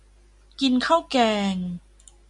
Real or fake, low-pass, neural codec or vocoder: real; 10.8 kHz; none